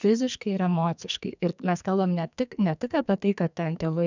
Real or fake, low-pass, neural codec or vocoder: fake; 7.2 kHz; codec, 44.1 kHz, 2.6 kbps, SNAC